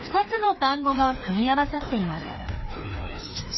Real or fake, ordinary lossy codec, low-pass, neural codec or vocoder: fake; MP3, 24 kbps; 7.2 kHz; codec, 16 kHz, 2 kbps, FreqCodec, larger model